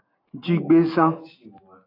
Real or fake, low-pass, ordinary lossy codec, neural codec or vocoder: real; 5.4 kHz; AAC, 32 kbps; none